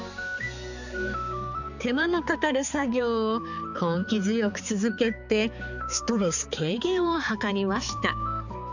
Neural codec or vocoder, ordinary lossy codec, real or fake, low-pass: codec, 16 kHz, 4 kbps, X-Codec, HuBERT features, trained on balanced general audio; none; fake; 7.2 kHz